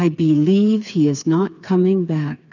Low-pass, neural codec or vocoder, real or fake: 7.2 kHz; codec, 16 kHz, 4 kbps, FreqCodec, smaller model; fake